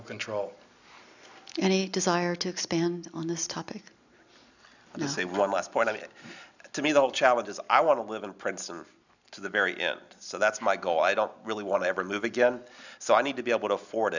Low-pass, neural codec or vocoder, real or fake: 7.2 kHz; none; real